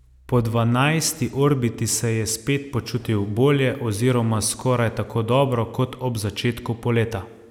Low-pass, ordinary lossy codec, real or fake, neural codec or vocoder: 19.8 kHz; none; real; none